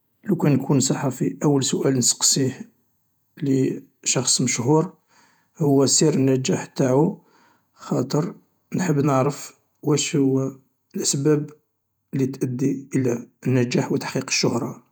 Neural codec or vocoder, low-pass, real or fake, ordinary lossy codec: vocoder, 48 kHz, 128 mel bands, Vocos; none; fake; none